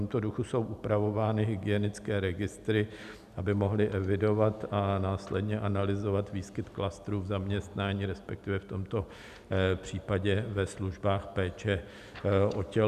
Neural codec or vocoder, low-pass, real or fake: none; 14.4 kHz; real